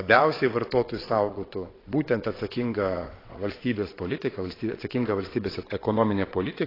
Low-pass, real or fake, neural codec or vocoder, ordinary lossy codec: 5.4 kHz; fake; vocoder, 22.05 kHz, 80 mel bands, WaveNeXt; AAC, 24 kbps